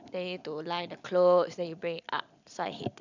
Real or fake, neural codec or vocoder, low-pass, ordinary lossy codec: real; none; 7.2 kHz; none